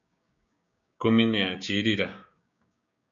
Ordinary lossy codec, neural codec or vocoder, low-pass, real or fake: MP3, 96 kbps; codec, 16 kHz, 6 kbps, DAC; 7.2 kHz; fake